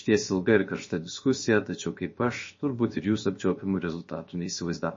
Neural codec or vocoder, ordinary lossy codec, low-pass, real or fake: codec, 16 kHz, 0.7 kbps, FocalCodec; MP3, 32 kbps; 7.2 kHz; fake